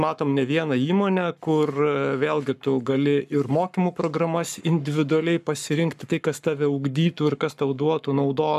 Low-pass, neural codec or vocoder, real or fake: 14.4 kHz; codec, 44.1 kHz, 7.8 kbps, Pupu-Codec; fake